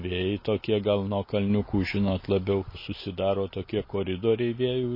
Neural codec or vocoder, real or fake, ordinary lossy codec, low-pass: none; real; MP3, 24 kbps; 5.4 kHz